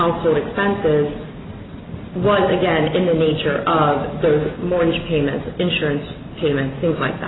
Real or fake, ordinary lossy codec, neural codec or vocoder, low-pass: real; AAC, 16 kbps; none; 7.2 kHz